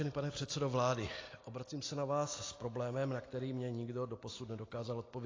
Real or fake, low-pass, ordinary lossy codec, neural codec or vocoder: real; 7.2 kHz; AAC, 32 kbps; none